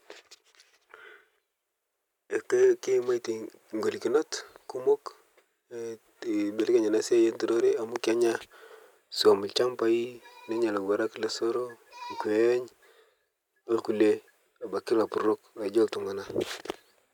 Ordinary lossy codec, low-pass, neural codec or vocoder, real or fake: none; 19.8 kHz; none; real